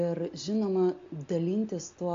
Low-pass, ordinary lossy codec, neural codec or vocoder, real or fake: 7.2 kHz; AAC, 48 kbps; none; real